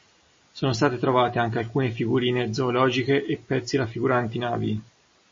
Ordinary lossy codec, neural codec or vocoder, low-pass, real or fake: MP3, 32 kbps; none; 7.2 kHz; real